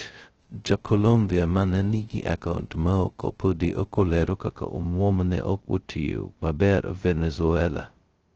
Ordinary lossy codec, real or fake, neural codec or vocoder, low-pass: Opus, 16 kbps; fake; codec, 16 kHz, 0.2 kbps, FocalCodec; 7.2 kHz